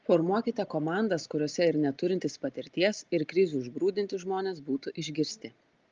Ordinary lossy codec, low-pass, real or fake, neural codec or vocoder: Opus, 24 kbps; 7.2 kHz; real; none